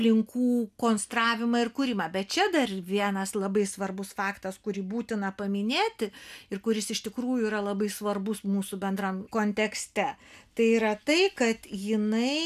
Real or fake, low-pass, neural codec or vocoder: real; 14.4 kHz; none